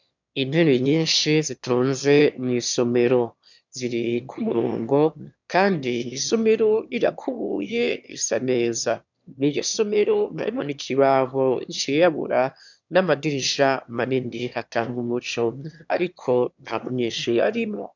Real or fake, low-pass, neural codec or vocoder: fake; 7.2 kHz; autoencoder, 22.05 kHz, a latent of 192 numbers a frame, VITS, trained on one speaker